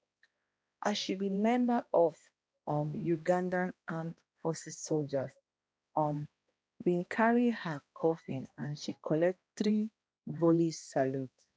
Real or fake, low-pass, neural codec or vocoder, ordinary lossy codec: fake; none; codec, 16 kHz, 1 kbps, X-Codec, HuBERT features, trained on balanced general audio; none